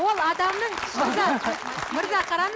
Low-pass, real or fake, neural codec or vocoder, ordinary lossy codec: none; real; none; none